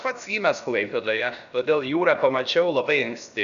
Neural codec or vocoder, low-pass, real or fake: codec, 16 kHz, about 1 kbps, DyCAST, with the encoder's durations; 7.2 kHz; fake